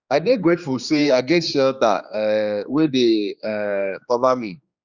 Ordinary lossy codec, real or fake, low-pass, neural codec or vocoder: Opus, 64 kbps; fake; 7.2 kHz; codec, 16 kHz, 2 kbps, X-Codec, HuBERT features, trained on general audio